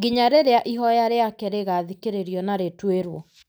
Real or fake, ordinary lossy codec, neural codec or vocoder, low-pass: real; none; none; none